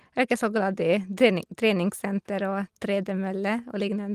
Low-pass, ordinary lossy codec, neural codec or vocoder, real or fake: 14.4 kHz; Opus, 24 kbps; none; real